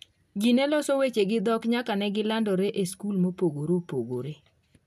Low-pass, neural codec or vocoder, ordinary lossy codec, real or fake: 14.4 kHz; none; none; real